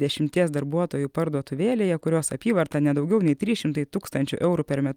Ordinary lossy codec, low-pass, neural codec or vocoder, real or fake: Opus, 32 kbps; 19.8 kHz; vocoder, 44.1 kHz, 128 mel bands every 256 samples, BigVGAN v2; fake